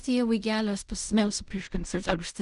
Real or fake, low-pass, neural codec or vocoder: fake; 10.8 kHz; codec, 16 kHz in and 24 kHz out, 0.4 kbps, LongCat-Audio-Codec, fine tuned four codebook decoder